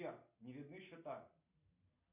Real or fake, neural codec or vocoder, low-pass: real; none; 3.6 kHz